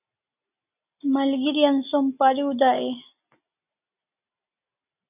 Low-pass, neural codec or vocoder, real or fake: 3.6 kHz; none; real